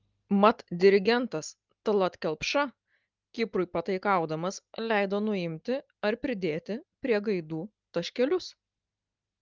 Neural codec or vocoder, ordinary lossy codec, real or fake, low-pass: none; Opus, 32 kbps; real; 7.2 kHz